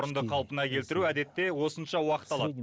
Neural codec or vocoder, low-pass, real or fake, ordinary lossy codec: none; none; real; none